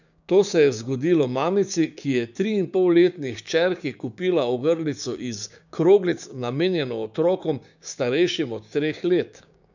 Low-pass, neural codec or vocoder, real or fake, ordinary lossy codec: 7.2 kHz; codec, 16 kHz, 6 kbps, DAC; fake; none